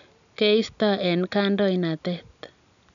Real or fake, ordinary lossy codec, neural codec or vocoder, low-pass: real; none; none; 7.2 kHz